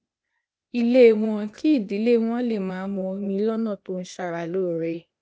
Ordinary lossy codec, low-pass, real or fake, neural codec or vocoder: none; none; fake; codec, 16 kHz, 0.8 kbps, ZipCodec